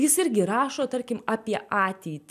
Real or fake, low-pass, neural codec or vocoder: real; 14.4 kHz; none